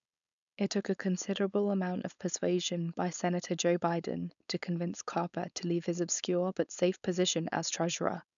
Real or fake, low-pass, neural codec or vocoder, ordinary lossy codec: fake; 7.2 kHz; codec, 16 kHz, 4.8 kbps, FACodec; none